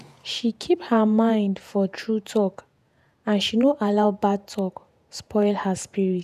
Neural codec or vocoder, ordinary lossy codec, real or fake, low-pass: vocoder, 48 kHz, 128 mel bands, Vocos; none; fake; 14.4 kHz